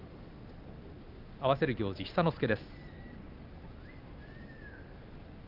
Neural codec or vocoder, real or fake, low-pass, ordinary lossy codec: none; real; 5.4 kHz; Opus, 24 kbps